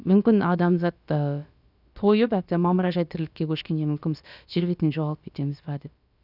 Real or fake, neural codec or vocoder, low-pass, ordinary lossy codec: fake; codec, 16 kHz, about 1 kbps, DyCAST, with the encoder's durations; 5.4 kHz; none